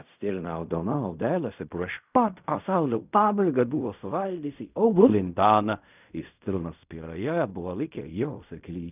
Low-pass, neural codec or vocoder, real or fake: 3.6 kHz; codec, 16 kHz in and 24 kHz out, 0.4 kbps, LongCat-Audio-Codec, fine tuned four codebook decoder; fake